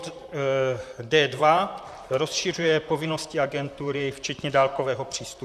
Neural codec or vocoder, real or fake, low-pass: vocoder, 44.1 kHz, 128 mel bands, Pupu-Vocoder; fake; 14.4 kHz